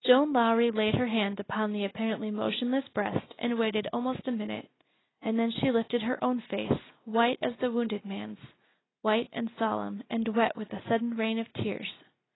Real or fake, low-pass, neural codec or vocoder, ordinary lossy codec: real; 7.2 kHz; none; AAC, 16 kbps